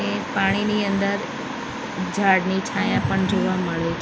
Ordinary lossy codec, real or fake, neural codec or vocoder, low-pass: none; real; none; none